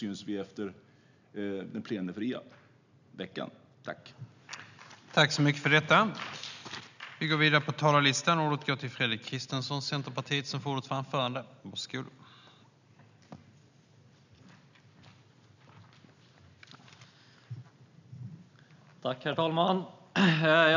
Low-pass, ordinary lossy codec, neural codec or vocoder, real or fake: 7.2 kHz; none; none; real